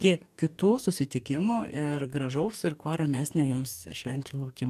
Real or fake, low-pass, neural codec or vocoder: fake; 14.4 kHz; codec, 44.1 kHz, 2.6 kbps, DAC